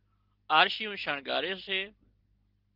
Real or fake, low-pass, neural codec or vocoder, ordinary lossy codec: real; 5.4 kHz; none; Opus, 16 kbps